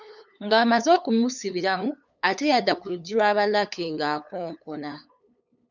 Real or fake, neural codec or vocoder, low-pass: fake; codec, 16 kHz, 8 kbps, FunCodec, trained on LibriTTS, 25 frames a second; 7.2 kHz